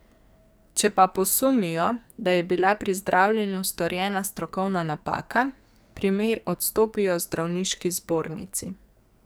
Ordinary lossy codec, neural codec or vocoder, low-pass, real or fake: none; codec, 44.1 kHz, 2.6 kbps, SNAC; none; fake